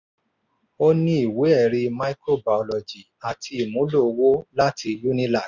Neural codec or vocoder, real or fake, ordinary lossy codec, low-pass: none; real; none; 7.2 kHz